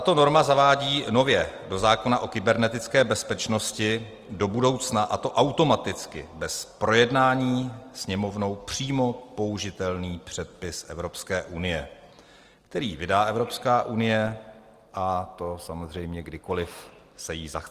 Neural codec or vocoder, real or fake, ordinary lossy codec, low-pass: none; real; Opus, 24 kbps; 14.4 kHz